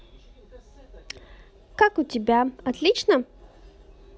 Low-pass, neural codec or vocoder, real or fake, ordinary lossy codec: none; none; real; none